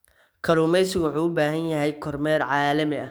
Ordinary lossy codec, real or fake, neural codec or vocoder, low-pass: none; fake; codec, 44.1 kHz, 7.8 kbps, DAC; none